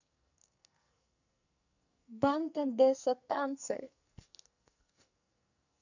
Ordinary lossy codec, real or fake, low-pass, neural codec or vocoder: none; fake; 7.2 kHz; codec, 44.1 kHz, 2.6 kbps, SNAC